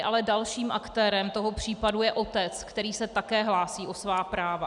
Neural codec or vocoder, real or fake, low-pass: none; real; 10.8 kHz